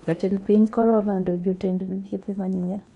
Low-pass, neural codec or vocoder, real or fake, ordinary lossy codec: 10.8 kHz; codec, 16 kHz in and 24 kHz out, 0.8 kbps, FocalCodec, streaming, 65536 codes; fake; none